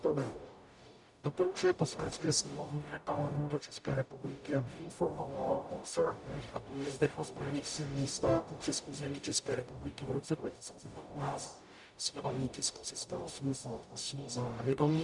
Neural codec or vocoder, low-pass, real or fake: codec, 44.1 kHz, 0.9 kbps, DAC; 10.8 kHz; fake